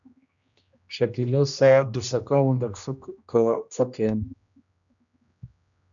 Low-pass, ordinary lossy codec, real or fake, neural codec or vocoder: 7.2 kHz; MP3, 96 kbps; fake; codec, 16 kHz, 1 kbps, X-Codec, HuBERT features, trained on general audio